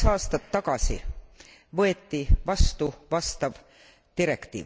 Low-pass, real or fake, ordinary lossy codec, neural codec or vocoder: none; real; none; none